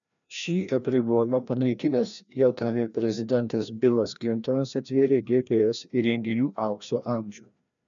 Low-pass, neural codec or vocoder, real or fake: 7.2 kHz; codec, 16 kHz, 1 kbps, FreqCodec, larger model; fake